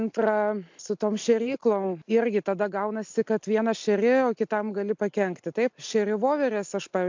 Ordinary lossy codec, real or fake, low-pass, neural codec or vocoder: MP3, 64 kbps; real; 7.2 kHz; none